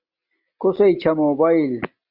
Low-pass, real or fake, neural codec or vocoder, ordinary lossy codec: 5.4 kHz; real; none; Opus, 64 kbps